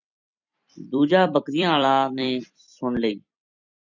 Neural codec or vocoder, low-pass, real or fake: vocoder, 44.1 kHz, 128 mel bands every 256 samples, BigVGAN v2; 7.2 kHz; fake